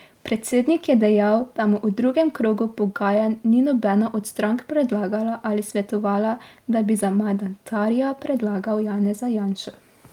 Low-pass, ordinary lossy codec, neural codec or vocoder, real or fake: 19.8 kHz; Opus, 32 kbps; none; real